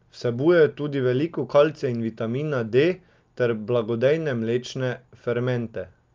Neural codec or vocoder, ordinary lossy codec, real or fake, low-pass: none; Opus, 32 kbps; real; 7.2 kHz